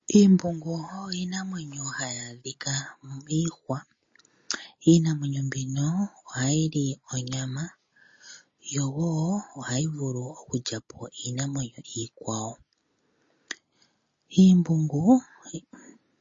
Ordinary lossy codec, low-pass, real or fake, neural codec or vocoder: MP3, 32 kbps; 7.2 kHz; real; none